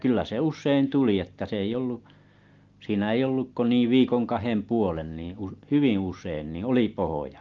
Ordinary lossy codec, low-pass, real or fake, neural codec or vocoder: Opus, 32 kbps; 7.2 kHz; real; none